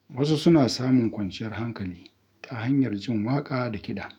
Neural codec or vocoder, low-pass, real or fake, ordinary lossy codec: autoencoder, 48 kHz, 128 numbers a frame, DAC-VAE, trained on Japanese speech; 19.8 kHz; fake; none